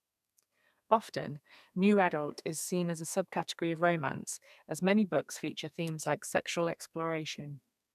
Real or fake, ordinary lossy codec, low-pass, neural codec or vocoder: fake; none; 14.4 kHz; codec, 32 kHz, 1.9 kbps, SNAC